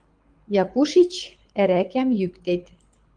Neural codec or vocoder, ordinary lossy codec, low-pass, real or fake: codec, 24 kHz, 6 kbps, HILCodec; Opus, 32 kbps; 9.9 kHz; fake